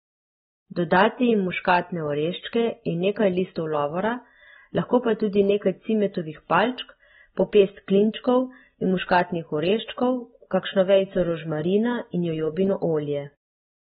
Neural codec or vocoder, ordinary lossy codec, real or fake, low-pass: none; AAC, 16 kbps; real; 7.2 kHz